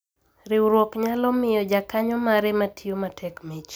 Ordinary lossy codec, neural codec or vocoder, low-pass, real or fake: none; none; none; real